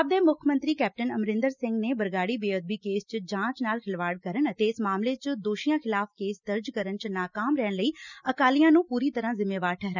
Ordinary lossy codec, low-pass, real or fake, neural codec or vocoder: none; 7.2 kHz; real; none